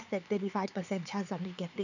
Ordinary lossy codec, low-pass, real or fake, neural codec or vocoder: none; 7.2 kHz; fake; codec, 16 kHz, 4 kbps, X-Codec, HuBERT features, trained on LibriSpeech